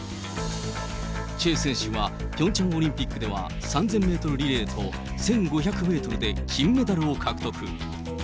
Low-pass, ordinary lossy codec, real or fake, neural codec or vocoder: none; none; real; none